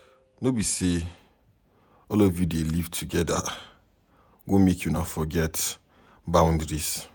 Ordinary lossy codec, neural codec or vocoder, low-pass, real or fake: none; vocoder, 48 kHz, 128 mel bands, Vocos; none; fake